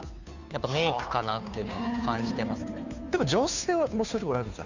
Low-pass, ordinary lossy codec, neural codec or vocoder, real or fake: 7.2 kHz; none; codec, 16 kHz, 2 kbps, FunCodec, trained on Chinese and English, 25 frames a second; fake